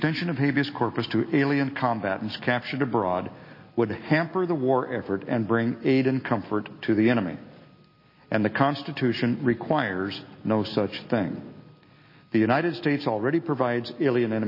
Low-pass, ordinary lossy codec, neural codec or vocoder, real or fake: 5.4 kHz; MP3, 24 kbps; none; real